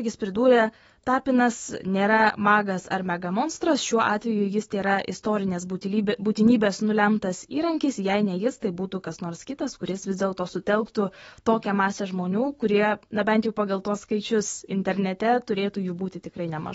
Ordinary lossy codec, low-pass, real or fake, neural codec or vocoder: AAC, 24 kbps; 19.8 kHz; fake; autoencoder, 48 kHz, 128 numbers a frame, DAC-VAE, trained on Japanese speech